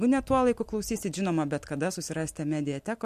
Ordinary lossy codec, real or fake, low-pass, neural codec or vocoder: MP3, 64 kbps; real; 14.4 kHz; none